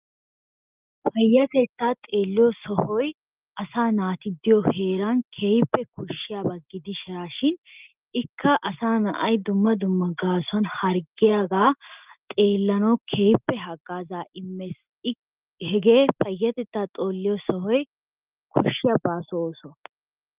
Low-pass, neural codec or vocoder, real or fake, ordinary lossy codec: 3.6 kHz; none; real; Opus, 32 kbps